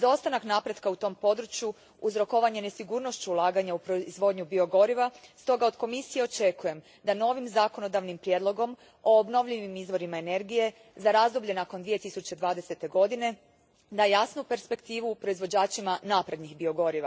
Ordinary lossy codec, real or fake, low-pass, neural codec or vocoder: none; real; none; none